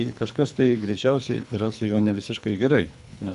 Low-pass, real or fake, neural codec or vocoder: 10.8 kHz; fake; codec, 24 kHz, 3 kbps, HILCodec